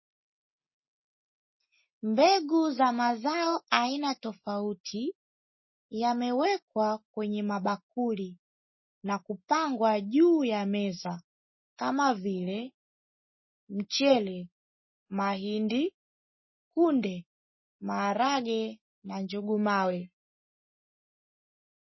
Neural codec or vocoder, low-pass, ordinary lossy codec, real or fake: none; 7.2 kHz; MP3, 24 kbps; real